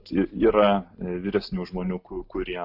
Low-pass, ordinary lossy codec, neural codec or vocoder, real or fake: 5.4 kHz; AAC, 48 kbps; none; real